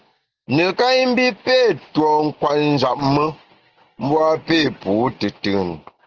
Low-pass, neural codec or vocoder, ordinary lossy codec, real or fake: 7.2 kHz; none; Opus, 16 kbps; real